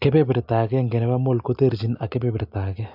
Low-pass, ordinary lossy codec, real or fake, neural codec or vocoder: 5.4 kHz; AAC, 48 kbps; real; none